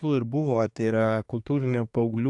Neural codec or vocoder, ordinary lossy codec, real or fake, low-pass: codec, 24 kHz, 1 kbps, SNAC; AAC, 64 kbps; fake; 10.8 kHz